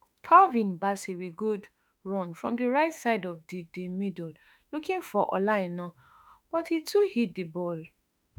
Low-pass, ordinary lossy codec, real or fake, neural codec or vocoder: none; none; fake; autoencoder, 48 kHz, 32 numbers a frame, DAC-VAE, trained on Japanese speech